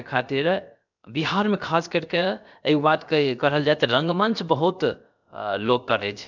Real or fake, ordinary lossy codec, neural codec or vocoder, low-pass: fake; none; codec, 16 kHz, about 1 kbps, DyCAST, with the encoder's durations; 7.2 kHz